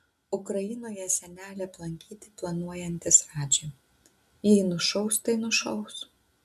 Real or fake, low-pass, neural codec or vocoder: real; 14.4 kHz; none